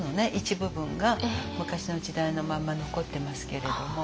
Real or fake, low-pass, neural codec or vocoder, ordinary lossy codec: real; none; none; none